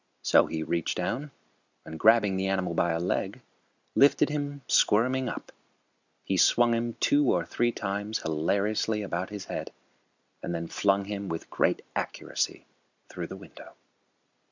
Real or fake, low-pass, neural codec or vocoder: real; 7.2 kHz; none